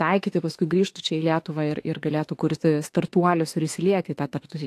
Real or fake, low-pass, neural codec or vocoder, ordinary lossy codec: fake; 14.4 kHz; autoencoder, 48 kHz, 32 numbers a frame, DAC-VAE, trained on Japanese speech; AAC, 64 kbps